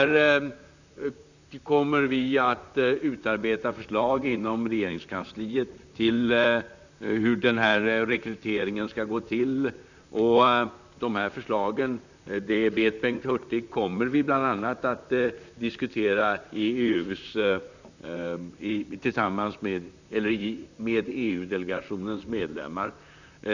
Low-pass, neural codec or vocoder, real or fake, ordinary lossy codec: 7.2 kHz; vocoder, 44.1 kHz, 128 mel bands, Pupu-Vocoder; fake; none